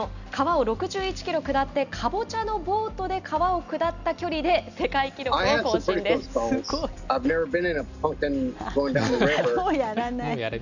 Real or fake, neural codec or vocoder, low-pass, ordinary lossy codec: real; none; 7.2 kHz; none